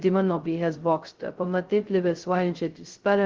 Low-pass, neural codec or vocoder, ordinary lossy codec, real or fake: 7.2 kHz; codec, 16 kHz, 0.2 kbps, FocalCodec; Opus, 16 kbps; fake